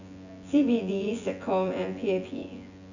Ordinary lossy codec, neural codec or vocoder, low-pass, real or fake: none; vocoder, 24 kHz, 100 mel bands, Vocos; 7.2 kHz; fake